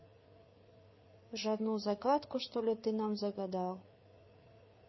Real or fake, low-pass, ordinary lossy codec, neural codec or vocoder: fake; 7.2 kHz; MP3, 24 kbps; codec, 16 kHz, 4 kbps, FreqCodec, larger model